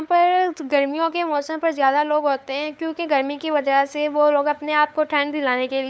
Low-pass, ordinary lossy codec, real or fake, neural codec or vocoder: none; none; fake; codec, 16 kHz, 8 kbps, FunCodec, trained on LibriTTS, 25 frames a second